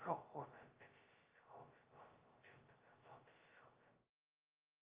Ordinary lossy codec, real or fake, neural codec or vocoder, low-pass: Opus, 32 kbps; fake; codec, 16 kHz, 0.2 kbps, FocalCodec; 3.6 kHz